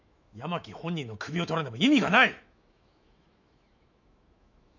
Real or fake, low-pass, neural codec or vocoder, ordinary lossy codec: fake; 7.2 kHz; autoencoder, 48 kHz, 128 numbers a frame, DAC-VAE, trained on Japanese speech; none